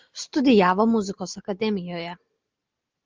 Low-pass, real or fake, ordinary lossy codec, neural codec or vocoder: 7.2 kHz; real; Opus, 32 kbps; none